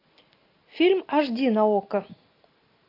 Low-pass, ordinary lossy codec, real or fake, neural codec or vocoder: 5.4 kHz; AAC, 32 kbps; real; none